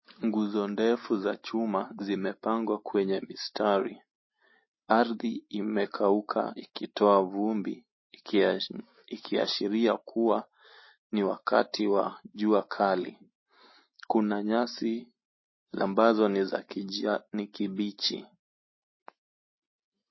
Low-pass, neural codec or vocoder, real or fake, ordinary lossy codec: 7.2 kHz; none; real; MP3, 24 kbps